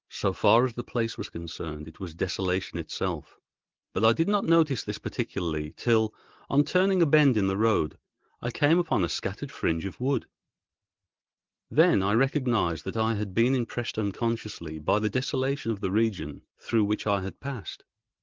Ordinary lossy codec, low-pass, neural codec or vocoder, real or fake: Opus, 16 kbps; 7.2 kHz; none; real